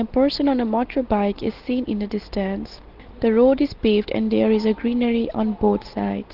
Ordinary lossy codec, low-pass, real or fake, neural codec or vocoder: Opus, 16 kbps; 5.4 kHz; real; none